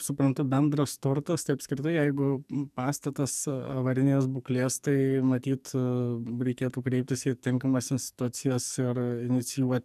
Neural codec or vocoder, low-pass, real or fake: codec, 44.1 kHz, 2.6 kbps, SNAC; 14.4 kHz; fake